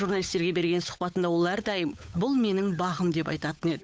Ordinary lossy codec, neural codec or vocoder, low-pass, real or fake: none; codec, 16 kHz, 8 kbps, FunCodec, trained on Chinese and English, 25 frames a second; none; fake